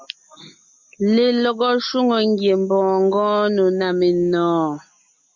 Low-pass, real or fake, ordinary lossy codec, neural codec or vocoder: 7.2 kHz; real; MP3, 64 kbps; none